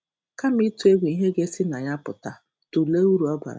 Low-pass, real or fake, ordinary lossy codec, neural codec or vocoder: none; real; none; none